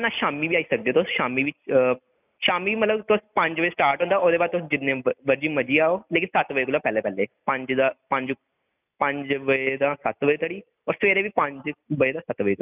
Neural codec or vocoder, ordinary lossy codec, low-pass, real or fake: none; none; 3.6 kHz; real